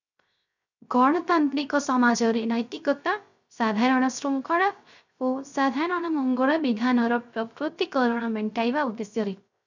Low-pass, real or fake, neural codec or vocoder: 7.2 kHz; fake; codec, 16 kHz, 0.3 kbps, FocalCodec